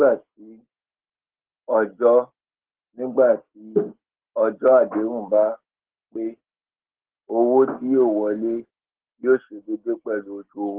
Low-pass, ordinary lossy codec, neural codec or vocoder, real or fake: 3.6 kHz; Opus, 16 kbps; none; real